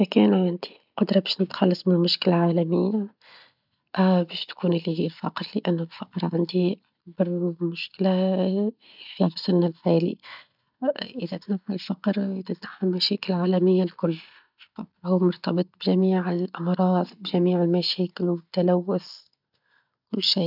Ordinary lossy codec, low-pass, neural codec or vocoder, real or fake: none; 5.4 kHz; none; real